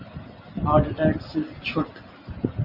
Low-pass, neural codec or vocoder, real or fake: 5.4 kHz; none; real